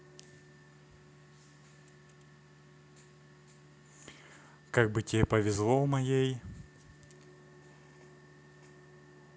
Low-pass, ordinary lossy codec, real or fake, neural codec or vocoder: none; none; real; none